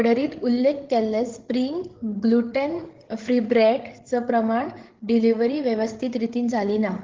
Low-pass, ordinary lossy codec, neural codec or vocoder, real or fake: 7.2 kHz; Opus, 16 kbps; codec, 16 kHz, 8 kbps, FreqCodec, larger model; fake